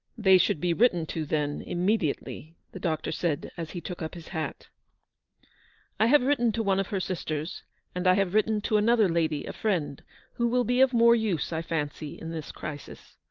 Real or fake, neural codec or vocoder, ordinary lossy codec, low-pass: real; none; Opus, 24 kbps; 7.2 kHz